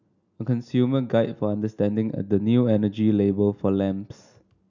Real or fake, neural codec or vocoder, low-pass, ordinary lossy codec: real; none; 7.2 kHz; none